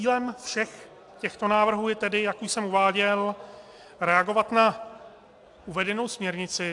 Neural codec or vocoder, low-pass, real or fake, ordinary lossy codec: none; 10.8 kHz; real; AAC, 64 kbps